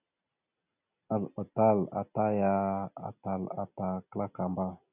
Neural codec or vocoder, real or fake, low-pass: none; real; 3.6 kHz